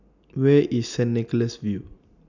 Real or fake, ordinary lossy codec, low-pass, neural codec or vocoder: real; none; 7.2 kHz; none